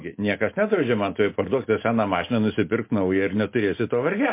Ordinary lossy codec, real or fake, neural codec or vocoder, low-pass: MP3, 24 kbps; real; none; 3.6 kHz